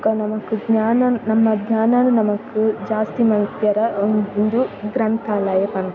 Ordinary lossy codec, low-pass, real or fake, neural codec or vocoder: none; 7.2 kHz; real; none